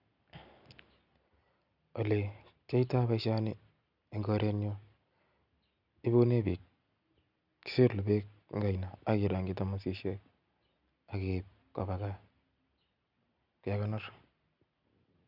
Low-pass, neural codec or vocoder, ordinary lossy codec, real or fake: 5.4 kHz; none; none; real